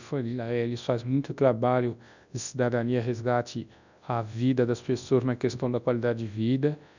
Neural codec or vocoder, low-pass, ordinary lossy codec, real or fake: codec, 24 kHz, 0.9 kbps, WavTokenizer, large speech release; 7.2 kHz; none; fake